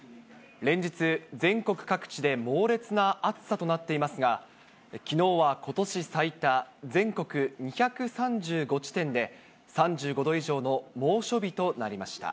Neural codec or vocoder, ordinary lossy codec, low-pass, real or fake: none; none; none; real